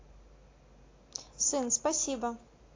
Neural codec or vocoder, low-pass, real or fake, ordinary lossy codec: none; 7.2 kHz; real; AAC, 32 kbps